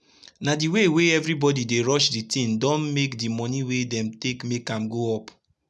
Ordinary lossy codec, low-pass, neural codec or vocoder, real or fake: none; none; none; real